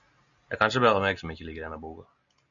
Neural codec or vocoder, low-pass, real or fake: none; 7.2 kHz; real